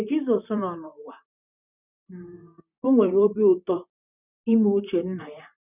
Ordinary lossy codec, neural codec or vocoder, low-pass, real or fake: none; vocoder, 44.1 kHz, 128 mel bands, Pupu-Vocoder; 3.6 kHz; fake